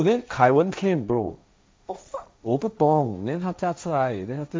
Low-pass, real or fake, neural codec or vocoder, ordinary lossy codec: none; fake; codec, 16 kHz, 1.1 kbps, Voila-Tokenizer; none